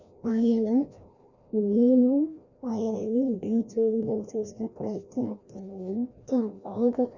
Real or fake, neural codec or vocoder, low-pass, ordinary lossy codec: fake; codec, 16 kHz, 1 kbps, FreqCodec, larger model; 7.2 kHz; none